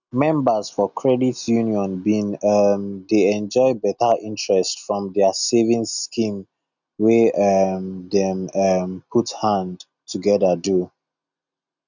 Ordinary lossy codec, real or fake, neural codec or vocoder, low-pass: none; real; none; 7.2 kHz